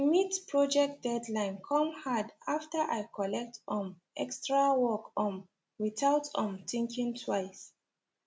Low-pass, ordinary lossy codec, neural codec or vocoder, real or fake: none; none; none; real